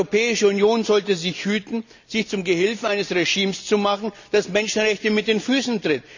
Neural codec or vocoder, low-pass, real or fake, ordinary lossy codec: none; 7.2 kHz; real; none